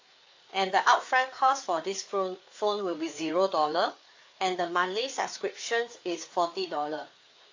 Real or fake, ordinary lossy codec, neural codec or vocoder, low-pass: fake; AAC, 48 kbps; codec, 16 kHz, 4 kbps, FreqCodec, larger model; 7.2 kHz